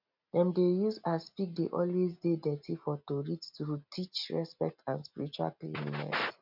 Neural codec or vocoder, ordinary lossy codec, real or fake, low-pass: none; none; real; 5.4 kHz